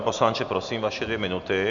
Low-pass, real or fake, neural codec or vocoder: 7.2 kHz; real; none